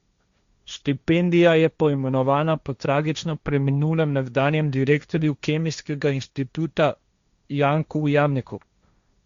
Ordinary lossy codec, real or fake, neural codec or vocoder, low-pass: Opus, 64 kbps; fake; codec, 16 kHz, 1.1 kbps, Voila-Tokenizer; 7.2 kHz